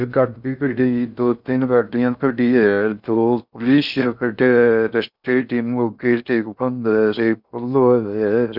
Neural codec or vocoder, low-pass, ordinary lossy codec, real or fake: codec, 16 kHz in and 24 kHz out, 0.6 kbps, FocalCodec, streaming, 2048 codes; 5.4 kHz; none; fake